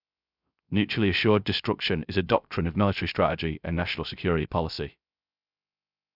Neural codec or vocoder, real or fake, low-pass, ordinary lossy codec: codec, 16 kHz, 0.3 kbps, FocalCodec; fake; 5.4 kHz; none